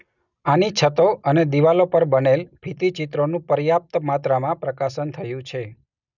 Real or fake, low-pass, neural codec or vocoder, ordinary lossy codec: real; 7.2 kHz; none; none